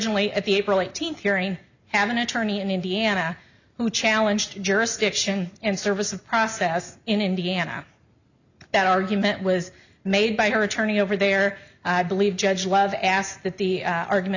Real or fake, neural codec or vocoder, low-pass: real; none; 7.2 kHz